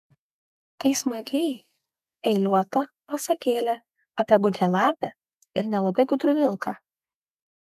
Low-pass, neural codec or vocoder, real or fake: 14.4 kHz; codec, 32 kHz, 1.9 kbps, SNAC; fake